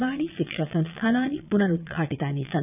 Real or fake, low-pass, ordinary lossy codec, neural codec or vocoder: fake; 3.6 kHz; none; vocoder, 22.05 kHz, 80 mel bands, Vocos